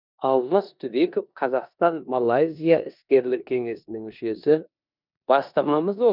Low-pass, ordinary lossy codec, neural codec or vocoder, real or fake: 5.4 kHz; none; codec, 16 kHz in and 24 kHz out, 0.9 kbps, LongCat-Audio-Codec, four codebook decoder; fake